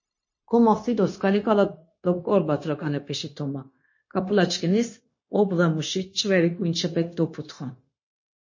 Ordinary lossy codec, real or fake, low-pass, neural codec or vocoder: MP3, 32 kbps; fake; 7.2 kHz; codec, 16 kHz, 0.9 kbps, LongCat-Audio-Codec